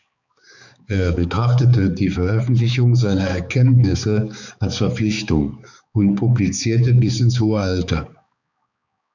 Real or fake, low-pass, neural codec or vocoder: fake; 7.2 kHz; codec, 16 kHz, 4 kbps, X-Codec, HuBERT features, trained on balanced general audio